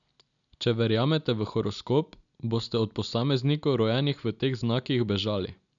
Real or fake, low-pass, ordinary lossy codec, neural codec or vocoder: real; 7.2 kHz; none; none